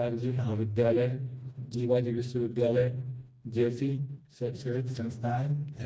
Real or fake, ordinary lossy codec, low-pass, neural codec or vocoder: fake; none; none; codec, 16 kHz, 1 kbps, FreqCodec, smaller model